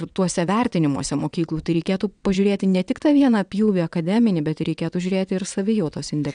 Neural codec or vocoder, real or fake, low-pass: vocoder, 22.05 kHz, 80 mel bands, WaveNeXt; fake; 9.9 kHz